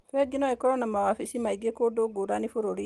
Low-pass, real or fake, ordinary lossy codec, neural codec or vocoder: 10.8 kHz; real; Opus, 24 kbps; none